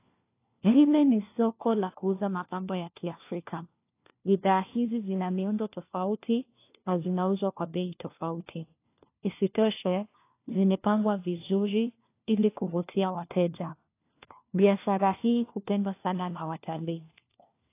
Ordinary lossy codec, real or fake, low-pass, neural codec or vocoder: AAC, 24 kbps; fake; 3.6 kHz; codec, 16 kHz, 1 kbps, FunCodec, trained on LibriTTS, 50 frames a second